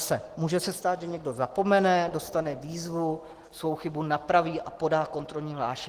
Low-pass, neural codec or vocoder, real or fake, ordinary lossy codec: 14.4 kHz; none; real; Opus, 16 kbps